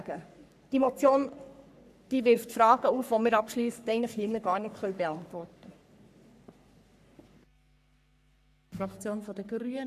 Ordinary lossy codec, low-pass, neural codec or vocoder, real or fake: none; 14.4 kHz; codec, 44.1 kHz, 3.4 kbps, Pupu-Codec; fake